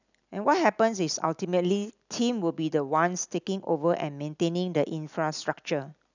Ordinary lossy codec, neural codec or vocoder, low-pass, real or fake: none; none; 7.2 kHz; real